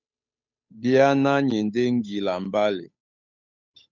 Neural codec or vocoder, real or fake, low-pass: codec, 16 kHz, 8 kbps, FunCodec, trained on Chinese and English, 25 frames a second; fake; 7.2 kHz